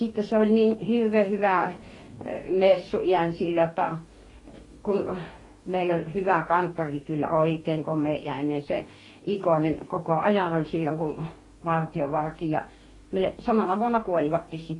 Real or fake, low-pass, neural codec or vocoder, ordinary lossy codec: fake; 10.8 kHz; codec, 44.1 kHz, 2.6 kbps, DAC; AAC, 32 kbps